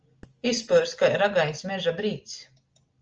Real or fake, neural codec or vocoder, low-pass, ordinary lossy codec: real; none; 7.2 kHz; Opus, 24 kbps